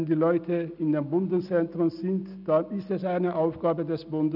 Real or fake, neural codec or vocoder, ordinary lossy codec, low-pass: real; none; none; 5.4 kHz